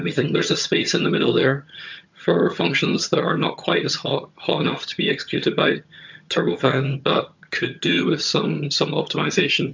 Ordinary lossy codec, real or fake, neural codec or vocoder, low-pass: MP3, 64 kbps; fake; vocoder, 22.05 kHz, 80 mel bands, HiFi-GAN; 7.2 kHz